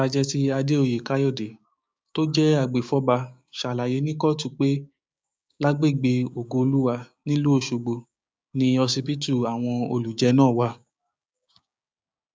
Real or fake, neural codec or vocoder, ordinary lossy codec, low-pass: fake; codec, 16 kHz, 6 kbps, DAC; none; none